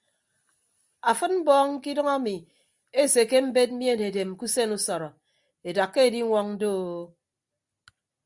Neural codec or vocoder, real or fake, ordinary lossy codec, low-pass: none; real; Opus, 64 kbps; 10.8 kHz